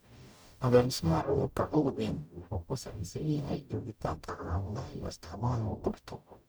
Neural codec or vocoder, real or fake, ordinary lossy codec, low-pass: codec, 44.1 kHz, 0.9 kbps, DAC; fake; none; none